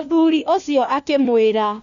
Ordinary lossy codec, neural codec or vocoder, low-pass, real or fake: none; codec, 16 kHz, 1 kbps, FunCodec, trained on LibriTTS, 50 frames a second; 7.2 kHz; fake